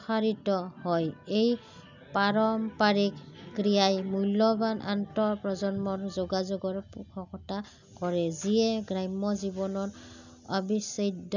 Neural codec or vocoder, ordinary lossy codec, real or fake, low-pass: none; none; real; 7.2 kHz